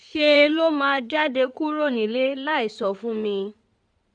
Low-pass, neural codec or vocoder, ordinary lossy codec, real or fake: 9.9 kHz; codec, 16 kHz in and 24 kHz out, 2.2 kbps, FireRedTTS-2 codec; none; fake